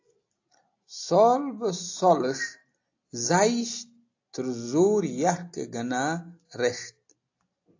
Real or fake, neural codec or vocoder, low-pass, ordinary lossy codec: real; none; 7.2 kHz; AAC, 48 kbps